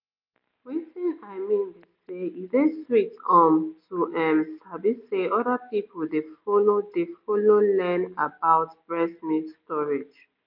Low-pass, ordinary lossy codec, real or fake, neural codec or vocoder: 5.4 kHz; MP3, 48 kbps; real; none